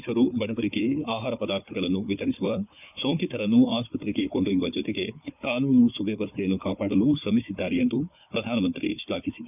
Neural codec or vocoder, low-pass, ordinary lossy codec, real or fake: codec, 16 kHz, 4 kbps, FunCodec, trained on Chinese and English, 50 frames a second; 3.6 kHz; none; fake